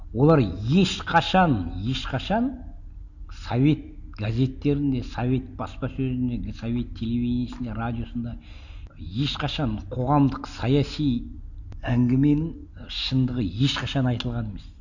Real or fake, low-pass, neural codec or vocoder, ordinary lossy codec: real; 7.2 kHz; none; none